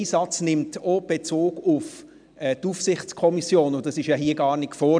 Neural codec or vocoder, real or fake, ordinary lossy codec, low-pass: none; real; MP3, 96 kbps; 9.9 kHz